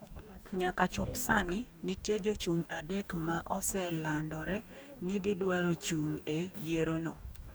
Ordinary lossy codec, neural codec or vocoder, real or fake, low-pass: none; codec, 44.1 kHz, 2.6 kbps, DAC; fake; none